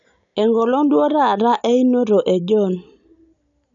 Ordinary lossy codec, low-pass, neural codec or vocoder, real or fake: none; 7.2 kHz; none; real